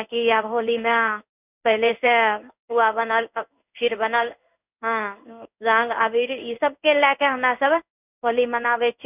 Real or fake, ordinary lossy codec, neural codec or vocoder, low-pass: fake; none; codec, 16 kHz in and 24 kHz out, 1 kbps, XY-Tokenizer; 3.6 kHz